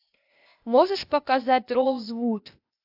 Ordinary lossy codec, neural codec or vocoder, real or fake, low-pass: AAC, 48 kbps; codec, 16 kHz, 0.8 kbps, ZipCodec; fake; 5.4 kHz